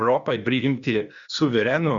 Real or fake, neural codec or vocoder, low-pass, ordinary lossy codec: fake; codec, 16 kHz, 0.8 kbps, ZipCodec; 7.2 kHz; Opus, 64 kbps